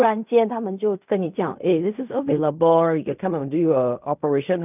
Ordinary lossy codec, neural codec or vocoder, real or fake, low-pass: none; codec, 16 kHz in and 24 kHz out, 0.4 kbps, LongCat-Audio-Codec, fine tuned four codebook decoder; fake; 3.6 kHz